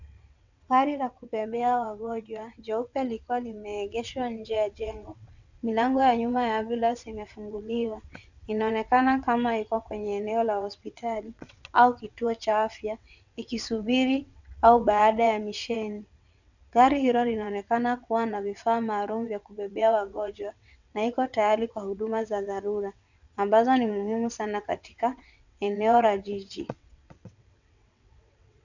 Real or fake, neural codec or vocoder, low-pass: fake; vocoder, 22.05 kHz, 80 mel bands, WaveNeXt; 7.2 kHz